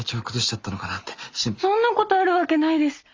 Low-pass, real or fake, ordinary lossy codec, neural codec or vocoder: 7.2 kHz; real; Opus, 32 kbps; none